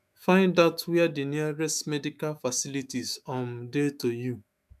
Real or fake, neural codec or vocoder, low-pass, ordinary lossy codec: fake; autoencoder, 48 kHz, 128 numbers a frame, DAC-VAE, trained on Japanese speech; 14.4 kHz; none